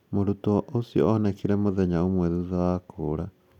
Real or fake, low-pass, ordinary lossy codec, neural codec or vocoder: real; 19.8 kHz; none; none